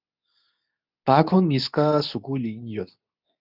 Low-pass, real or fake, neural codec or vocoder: 5.4 kHz; fake; codec, 24 kHz, 0.9 kbps, WavTokenizer, medium speech release version 2